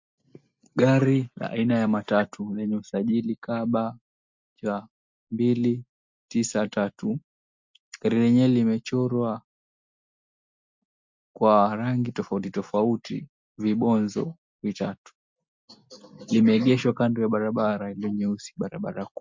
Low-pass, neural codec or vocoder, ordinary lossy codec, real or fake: 7.2 kHz; none; MP3, 64 kbps; real